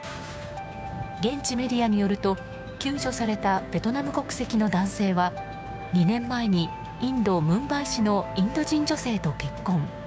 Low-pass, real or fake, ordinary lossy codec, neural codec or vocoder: none; fake; none; codec, 16 kHz, 6 kbps, DAC